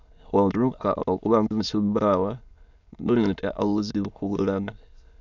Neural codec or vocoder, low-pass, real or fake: autoencoder, 22.05 kHz, a latent of 192 numbers a frame, VITS, trained on many speakers; 7.2 kHz; fake